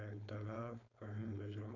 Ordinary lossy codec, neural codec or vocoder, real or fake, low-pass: none; codec, 16 kHz, 4.8 kbps, FACodec; fake; 7.2 kHz